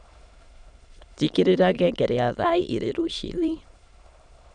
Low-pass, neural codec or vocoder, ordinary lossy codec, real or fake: 9.9 kHz; autoencoder, 22.05 kHz, a latent of 192 numbers a frame, VITS, trained on many speakers; none; fake